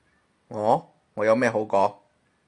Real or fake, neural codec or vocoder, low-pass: real; none; 10.8 kHz